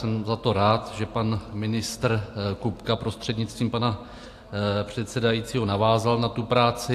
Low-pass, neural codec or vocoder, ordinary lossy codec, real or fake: 14.4 kHz; none; AAC, 64 kbps; real